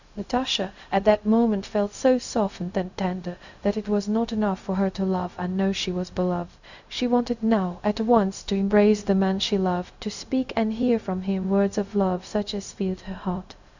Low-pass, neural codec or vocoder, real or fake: 7.2 kHz; codec, 16 kHz, 0.4 kbps, LongCat-Audio-Codec; fake